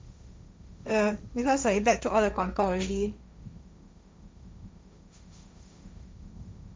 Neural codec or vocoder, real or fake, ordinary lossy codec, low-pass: codec, 16 kHz, 1.1 kbps, Voila-Tokenizer; fake; none; none